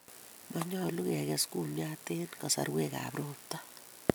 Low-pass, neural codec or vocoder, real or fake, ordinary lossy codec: none; none; real; none